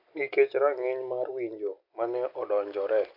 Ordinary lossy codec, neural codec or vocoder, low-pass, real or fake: MP3, 48 kbps; none; 5.4 kHz; real